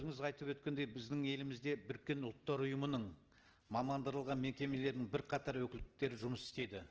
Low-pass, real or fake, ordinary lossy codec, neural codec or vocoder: 7.2 kHz; real; Opus, 16 kbps; none